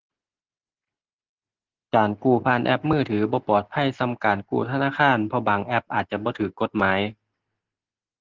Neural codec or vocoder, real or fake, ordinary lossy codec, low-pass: none; real; Opus, 16 kbps; 7.2 kHz